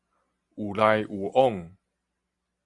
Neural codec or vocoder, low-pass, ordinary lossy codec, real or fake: none; 10.8 kHz; Opus, 64 kbps; real